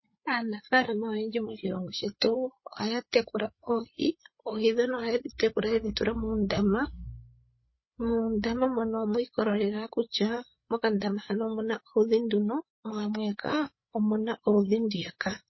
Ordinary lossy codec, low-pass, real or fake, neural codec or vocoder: MP3, 24 kbps; 7.2 kHz; fake; codec, 16 kHz, 8 kbps, FreqCodec, larger model